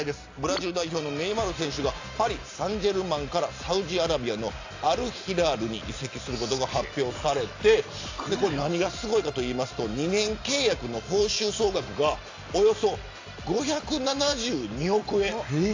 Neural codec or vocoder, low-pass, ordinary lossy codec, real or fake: none; 7.2 kHz; AAC, 48 kbps; real